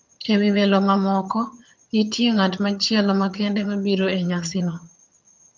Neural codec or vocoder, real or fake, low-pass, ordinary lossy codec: vocoder, 22.05 kHz, 80 mel bands, HiFi-GAN; fake; 7.2 kHz; Opus, 24 kbps